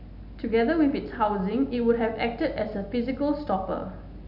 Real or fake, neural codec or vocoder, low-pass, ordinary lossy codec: real; none; 5.4 kHz; none